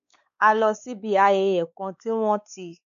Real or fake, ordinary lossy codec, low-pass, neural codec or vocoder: fake; none; 7.2 kHz; codec, 16 kHz, 4 kbps, X-Codec, WavLM features, trained on Multilingual LibriSpeech